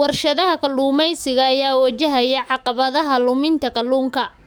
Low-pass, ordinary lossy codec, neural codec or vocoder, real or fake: none; none; codec, 44.1 kHz, 7.8 kbps, DAC; fake